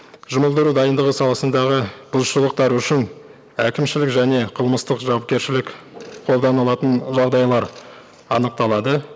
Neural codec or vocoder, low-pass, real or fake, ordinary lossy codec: none; none; real; none